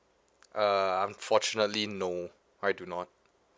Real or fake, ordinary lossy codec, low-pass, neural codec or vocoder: real; none; none; none